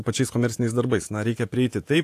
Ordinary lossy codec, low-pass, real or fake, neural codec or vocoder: AAC, 64 kbps; 14.4 kHz; fake; vocoder, 48 kHz, 128 mel bands, Vocos